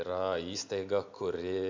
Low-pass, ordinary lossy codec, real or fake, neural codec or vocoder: 7.2 kHz; MP3, 64 kbps; real; none